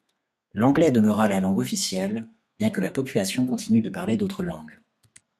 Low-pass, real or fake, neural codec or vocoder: 14.4 kHz; fake; codec, 32 kHz, 1.9 kbps, SNAC